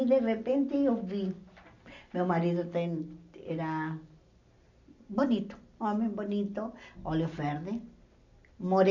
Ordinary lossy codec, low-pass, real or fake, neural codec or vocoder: none; 7.2 kHz; real; none